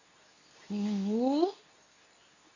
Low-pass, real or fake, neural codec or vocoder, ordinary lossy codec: 7.2 kHz; fake; codec, 24 kHz, 0.9 kbps, WavTokenizer, medium speech release version 2; none